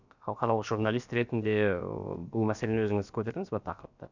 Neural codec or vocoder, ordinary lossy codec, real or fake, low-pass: codec, 16 kHz, about 1 kbps, DyCAST, with the encoder's durations; none; fake; 7.2 kHz